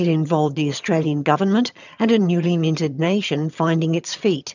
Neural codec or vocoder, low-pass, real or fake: vocoder, 22.05 kHz, 80 mel bands, HiFi-GAN; 7.2 kHz; fake